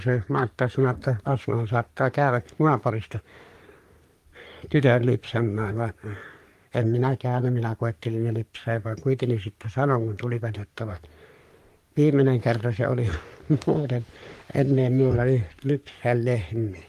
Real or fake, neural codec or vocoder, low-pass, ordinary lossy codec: fake; codec, 44.1 kHz, 3.4 kbps, Pupu-Codec; 14.4 kHz; Opus, 32 kbps